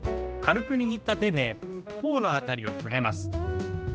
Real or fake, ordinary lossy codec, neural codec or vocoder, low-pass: fake; none; codec, 16 kHz, 1 kbps, X-Codec, HuBERT features, trained on general audio; none